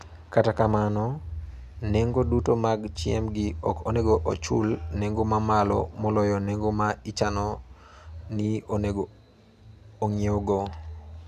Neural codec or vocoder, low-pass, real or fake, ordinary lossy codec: none; 14.4 kHz; real; none